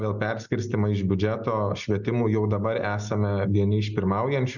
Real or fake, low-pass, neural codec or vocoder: real; 7.2 kHz; none